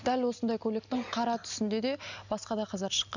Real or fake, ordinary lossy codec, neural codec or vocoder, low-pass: real; none; none; 7.2 kHz